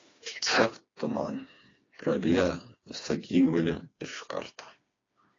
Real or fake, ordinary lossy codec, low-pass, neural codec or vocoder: fake; AAC, 32 kbps; 7.2 kHz; codec, 16 kHz, 2 kbps, FreqCodec, smaller model